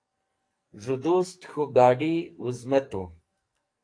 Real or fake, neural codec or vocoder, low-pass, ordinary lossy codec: fake; codec, 44.1 kHz, 2.6 kbps, SNAC; 9.9 kHz; AAC, 48 kbps